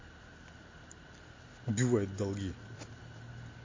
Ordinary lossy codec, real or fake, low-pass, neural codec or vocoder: MP3, 32 kbps; real; 7.2 kHz; none